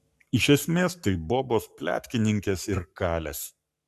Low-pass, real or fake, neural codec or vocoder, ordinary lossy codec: 14.4 kHz; fake; codec, 44.1 kHz, 7.8 kbps, Pupu-Codec; AAC, 96 kbps